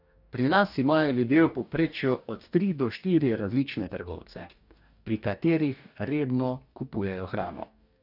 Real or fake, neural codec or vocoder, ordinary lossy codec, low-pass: fake; codec, 44.1 kHz, 2.6 kbps, DAC; none; 5.4 kHz